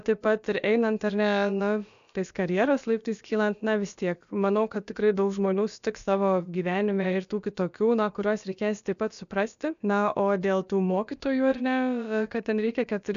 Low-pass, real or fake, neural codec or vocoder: 7.2 kHz; fake; codec, 16 kHz, about 1 kbps, DyCAST, with the encoder's durations